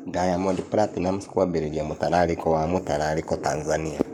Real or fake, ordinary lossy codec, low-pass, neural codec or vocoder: fake; none; 19.8 kHz; codec, 44.1 kHz, 7.8 kbps, Pupu-Codec